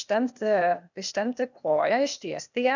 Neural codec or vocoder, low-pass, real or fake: codec, 16 kHz, 0.8 kbps, ZipCodec; 7.2 kHz; fake